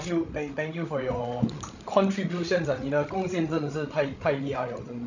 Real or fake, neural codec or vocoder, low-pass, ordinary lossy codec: fake; codec, 16 kHz, 16 kbps, FreqCodec, larger model; 7.2 kHz; none